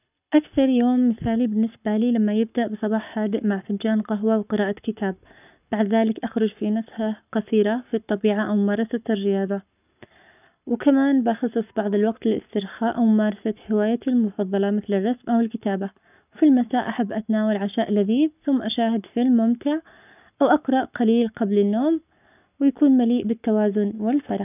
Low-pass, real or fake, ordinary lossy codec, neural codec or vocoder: 3.6 kHz; fake; none; codec, 44.1 kHz, 7.8 kbps, Pupu-Codec